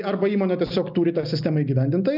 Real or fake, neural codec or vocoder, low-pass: real; none; 5.4 kHz